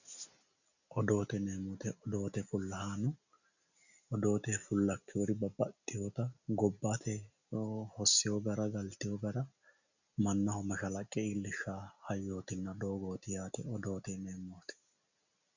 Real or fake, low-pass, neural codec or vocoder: real; 7.2 kHz; none